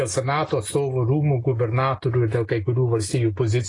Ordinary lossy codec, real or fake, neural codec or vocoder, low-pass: AAC, 32 kbps; real; none; 10.8 kHz